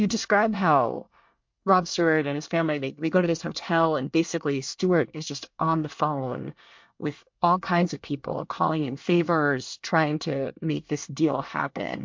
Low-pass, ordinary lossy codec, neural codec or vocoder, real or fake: 7.2 kHz; MP3, 48 kbps; codec, 24 kHz, 1 kbps, SNAC; fake